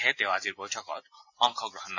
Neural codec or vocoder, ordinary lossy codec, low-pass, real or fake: none; AAC, 48 kbps; 7.2 kHz; real